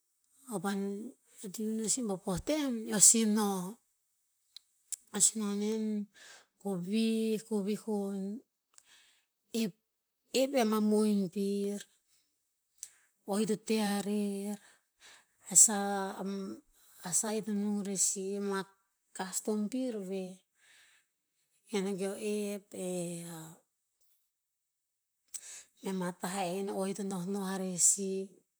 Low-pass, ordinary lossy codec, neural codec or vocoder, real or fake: none; none; vocoder, 44.1 kHz, 128 mel bands, Pupu-Vocoder; fake